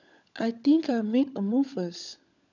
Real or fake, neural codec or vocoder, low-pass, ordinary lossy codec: fake; codec, 16 kHz, 16 kbps, FunCodec, trained on LibriTTS, 50 frames a second; 7.2 kHz; none